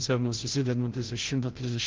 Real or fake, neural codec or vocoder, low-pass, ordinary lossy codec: fake; codec, 16 kHz, 0.5 kbps, FreqCodec, larger model; 7.2 kHz; Opus, 16 kbps